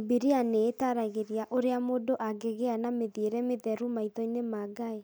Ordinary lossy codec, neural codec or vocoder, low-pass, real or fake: none; none; none; real